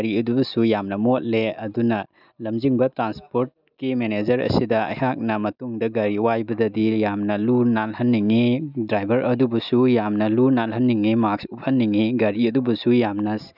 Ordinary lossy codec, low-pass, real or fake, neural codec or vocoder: none; 5.4 kHz; real; none